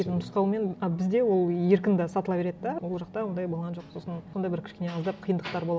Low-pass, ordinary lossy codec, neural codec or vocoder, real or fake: none; none; none; real